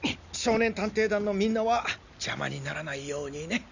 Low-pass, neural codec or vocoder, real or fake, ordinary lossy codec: 7.2 kHz; none; real; none